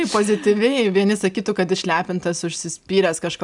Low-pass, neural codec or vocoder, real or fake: 10.8 kHz; none; real